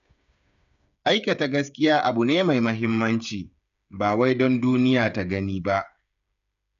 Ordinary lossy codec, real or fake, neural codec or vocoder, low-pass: none; fake; codec, 16 kHz, 8 kbps, FreqCodec, smaller model; 7.2 kHz